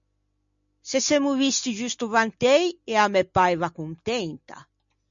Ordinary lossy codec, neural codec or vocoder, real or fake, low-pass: MP3, 64 kbps; none; real; 7.2 kHz